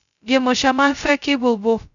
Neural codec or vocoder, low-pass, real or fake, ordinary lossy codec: codec, 16 kHz, 0.2 kbps, FocalCodec; 7.2 kHz; fake; none